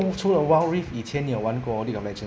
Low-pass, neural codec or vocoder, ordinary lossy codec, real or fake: none; none; none; real